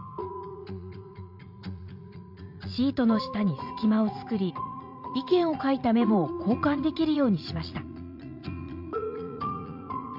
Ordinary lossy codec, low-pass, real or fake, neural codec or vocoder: MP3, 48 kbps; 5.4 kHz; fake; vocoder, 44.1 kHz, 80 mel bands, Vocos